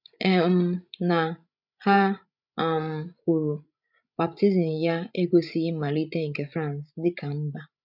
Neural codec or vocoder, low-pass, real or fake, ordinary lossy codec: codec, 16 kHz, 16 kbps, FreqCodec, larger model; 5.4 kHz; fake; none